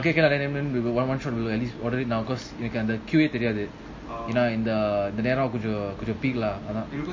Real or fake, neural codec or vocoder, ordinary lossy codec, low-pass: real; none; MP3, 32 kbps; 7.2 kHz